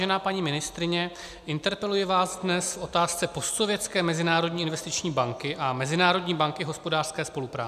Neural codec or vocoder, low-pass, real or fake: none; 14.4 kHz; real